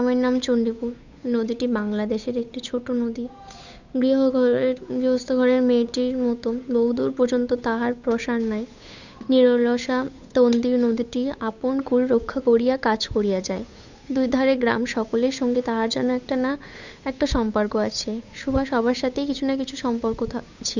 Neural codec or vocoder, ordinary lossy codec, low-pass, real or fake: codec, 16 kHz, 8 kbps, FunCodec, trained on Chinese and English, 25 frames a second; none; 7.2 kHz; fake